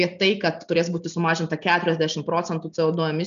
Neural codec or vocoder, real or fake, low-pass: none; real; 7.2 kHz